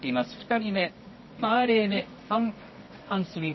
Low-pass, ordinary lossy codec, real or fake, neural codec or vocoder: 7.2 kHz; MP3, 24 kbps; fake; codec, 24 kHz, 0.9 kbps, WavTokenizer, medium music audio release